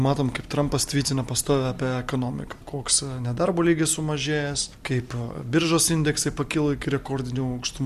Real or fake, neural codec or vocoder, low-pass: real; none; 14.4 kHz